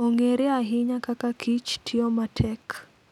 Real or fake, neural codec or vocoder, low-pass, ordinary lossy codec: real; none; 19.8 kHz; none